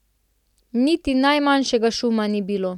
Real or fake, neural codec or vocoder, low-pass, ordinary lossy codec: real; none; 19.8 kHz; none